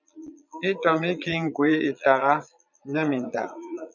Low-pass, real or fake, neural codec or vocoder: 7.2 kHz; fake; vocoder, 22.05 kHz, 80 mel bands, Vocos